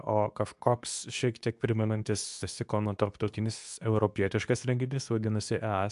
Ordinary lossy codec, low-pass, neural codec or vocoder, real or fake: AAC, 96 kbps; 10.8 kHz; codec, 24 kHz, 0.9 kbps, WavTokenizer, medium speech release version 2; fake